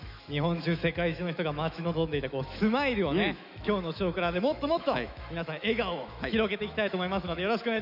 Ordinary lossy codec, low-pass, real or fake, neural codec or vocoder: none; 5.4 kHz; fake; autoencoder, 48 kHz, 128 numbers a frame, DAC-VAE, trained on Japanese speech